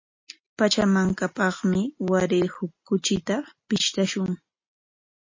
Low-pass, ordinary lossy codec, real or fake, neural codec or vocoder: 7.2 kHz; MP3, 32 kbps; real; none